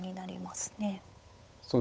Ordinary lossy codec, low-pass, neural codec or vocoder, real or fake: none; none; codec, 16 kHz, 8 kbps, FunCodec, trained on Chinese and English, 25 frames a second; fake